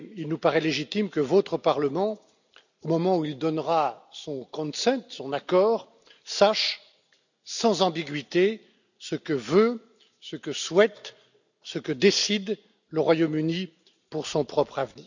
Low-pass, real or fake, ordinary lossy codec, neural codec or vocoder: 7.2 kHz; real; none; none